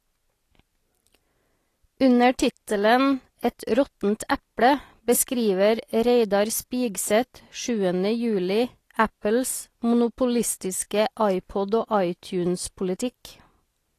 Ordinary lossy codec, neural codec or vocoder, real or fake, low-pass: AAC, 48 kbps; none; real; 14.4 kHz